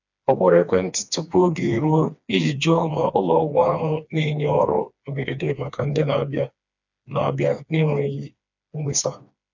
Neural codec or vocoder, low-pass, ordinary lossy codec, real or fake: codec, 16 kHz, 2 kbps, FreqCodec, smaller model; 7.2 kHz; none; fake